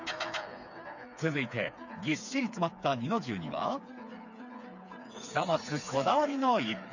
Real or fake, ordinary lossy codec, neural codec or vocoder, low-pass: fake; none; codec, 16 kHz, 4 kbps, FreqCodec, smaller model; 7.2 kHz